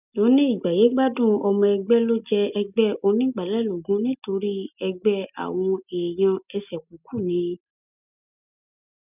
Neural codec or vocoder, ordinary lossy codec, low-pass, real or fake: none; none; 3.6 kHz; real